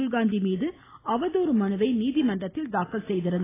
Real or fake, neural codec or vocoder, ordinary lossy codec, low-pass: real; none; AAC, 16 kbps; 3.6 kHz